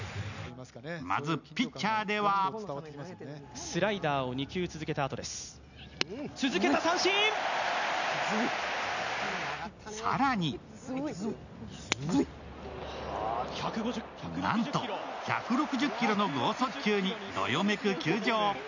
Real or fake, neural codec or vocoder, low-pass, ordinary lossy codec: real; none; 7.2 kHz; none